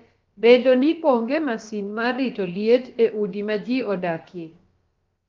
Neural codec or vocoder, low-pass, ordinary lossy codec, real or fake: codec, 16 kHz, about 1 kbps, DyCAST, with the encoder's durations; 7.2 kHz; Opus, 32 kbps; fake